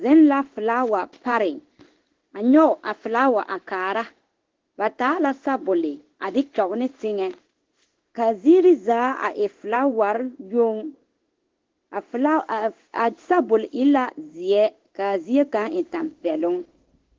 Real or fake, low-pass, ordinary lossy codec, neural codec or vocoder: fake; 7.2 kHz; Opus, 16 kbps; codec, 16 kHz in and 24 kHz out, 1 kbps, XY-Tokenizer